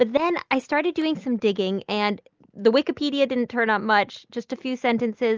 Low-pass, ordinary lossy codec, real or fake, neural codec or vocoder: 7.2 kHz; Opus, 24 kbps; real; none